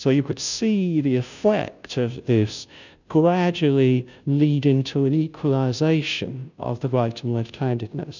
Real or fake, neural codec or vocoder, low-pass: fake; codec, 16 kHz, 0.5 kbps, FunCodec, trained on Chinese and English, 25 frames a second; 7.2 kHz